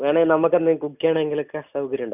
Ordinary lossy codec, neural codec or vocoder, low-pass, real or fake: none; none; 3.6 kHz; real